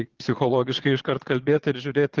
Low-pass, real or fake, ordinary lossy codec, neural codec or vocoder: 7.2 kHz; fake; Opus, 16 kbps; vocoder, 22.05 kHz, 80 mel bands, Vocos